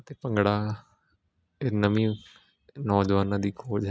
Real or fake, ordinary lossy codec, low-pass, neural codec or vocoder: real; none; none; none